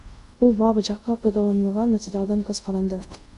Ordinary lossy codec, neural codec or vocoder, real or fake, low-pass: AAC, 96 kbps; codec, 24 kHz, 0.5 kbps, DualCodec; fake; 10.8 kHz